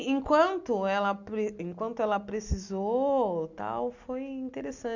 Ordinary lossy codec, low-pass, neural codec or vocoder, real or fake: none; 7.2 kHz; none; real